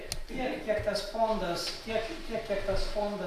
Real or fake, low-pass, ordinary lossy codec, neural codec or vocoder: real; 14.4 kHz; AAC, 64 kbps; none